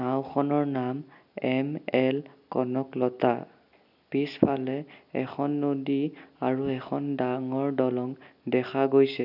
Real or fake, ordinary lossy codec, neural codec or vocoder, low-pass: real; none; none; 5.4 kHz